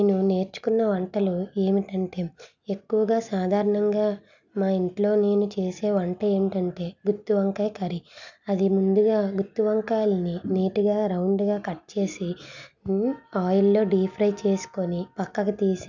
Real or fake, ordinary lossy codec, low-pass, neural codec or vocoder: real; none; 7.2 kHz; none